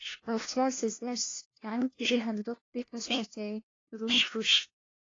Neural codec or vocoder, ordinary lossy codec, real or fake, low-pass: codec, 16 kHz, 1 kbps, FunCodec, trained on LibriTTS, 50 frames a second; AAC, 32 kbps; fake; 7.2 kHz